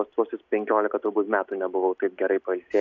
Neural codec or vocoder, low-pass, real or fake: none; 7.2 kHz; real